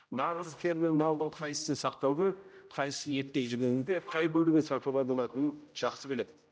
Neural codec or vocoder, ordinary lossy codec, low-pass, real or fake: codec, 16 kHz, 0.5 kbps, X-Codec, HuBERT features, trained on general audio; none; none; fake